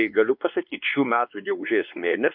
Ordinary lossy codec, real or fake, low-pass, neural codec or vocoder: AAC, 48 kbps; fake; 5.4 kHz; codec, 16 kHz, 2 kbps, X-Codec, WavLM features, trained on Multilingual LibriSpeech